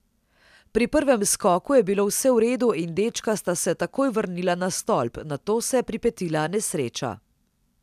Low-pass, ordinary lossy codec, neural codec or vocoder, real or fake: 14.4 kHz; none; none; real